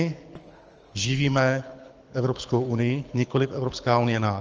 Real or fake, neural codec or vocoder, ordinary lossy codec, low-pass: fake; codec, 24 kHz, 6 kbps, HILCodec; Opus, 24 kbps; 7.2 kHz